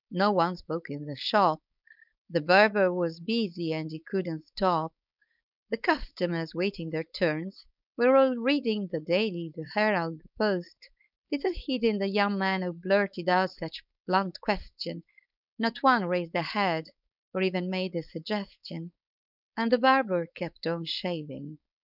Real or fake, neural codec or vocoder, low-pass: fake; codec, 16 kHz, 4.8 kbps, FACodec; 5.4 kHz